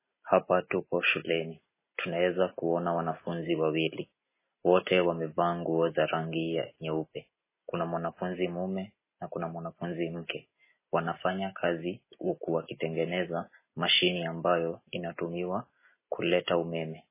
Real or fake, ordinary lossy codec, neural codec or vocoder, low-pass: real; MP3, 16 kbps; none; 3.6 kHz